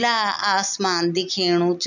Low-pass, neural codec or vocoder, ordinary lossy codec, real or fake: 7.2 kHz; none; none; real